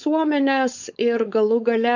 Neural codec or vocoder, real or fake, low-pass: codec, 16 kHz, 4.8 kbps, FACodec; fake; 7.2 kHz